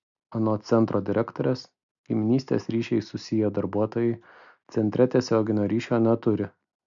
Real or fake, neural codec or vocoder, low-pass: real; none; 7.2 kHz